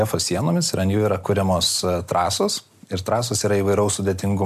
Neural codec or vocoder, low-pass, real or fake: none; 14.4 kHz; real